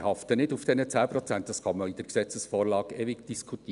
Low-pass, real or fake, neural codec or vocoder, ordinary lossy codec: 10.8 kHz; real; none; none